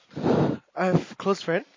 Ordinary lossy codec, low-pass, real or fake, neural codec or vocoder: MP3, 32 kbps; 7.2 kHz; real; none